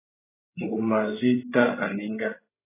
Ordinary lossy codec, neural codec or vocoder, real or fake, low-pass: AAC, 16 kbps; codec, 16 kHz, 16 kbps, FreqCodec, larger model; fake; 3.6 kHz